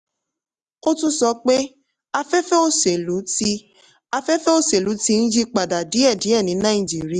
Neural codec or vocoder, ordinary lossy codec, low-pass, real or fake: none; Opus, 64 kbps; 10.8 kHz; real